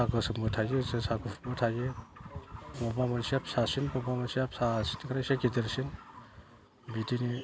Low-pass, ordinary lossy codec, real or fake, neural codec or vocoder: none; none; real; none